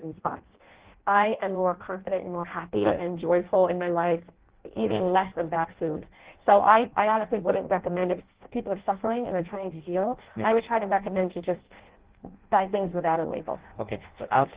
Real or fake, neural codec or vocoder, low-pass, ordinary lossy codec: fake; codec, 16 kHz in and 24 kHz out, 0.6 kbps, FireRedTTS-2 codec; 3.6 kHz; Opus, 16 kbps